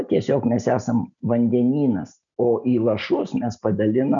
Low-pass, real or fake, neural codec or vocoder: 7.2 kHz; real; none